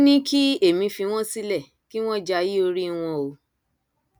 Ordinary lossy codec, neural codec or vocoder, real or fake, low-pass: none; none; real; none